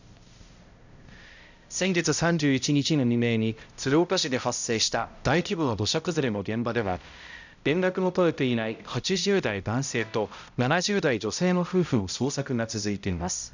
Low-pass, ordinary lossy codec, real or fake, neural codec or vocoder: 7.2 kHz; none; fake; codec, 16 kHz, 0.5 kbps, X-Codec, HuBERT features, trained on balanced general audio